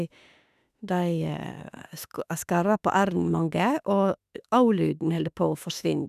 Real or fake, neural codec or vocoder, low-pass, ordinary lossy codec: fake; autoencoder, 48 kHz, 32 numbers a frame, DAC-VAE, trained on Japanese speech; 14.4 kHz; none